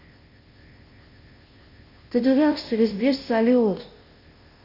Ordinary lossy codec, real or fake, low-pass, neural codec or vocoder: none; fake; 5.4 kHz; codec, 16 kHz, 0.5 kbps, FunCodec, trained on Chinese and English, 25 frames a second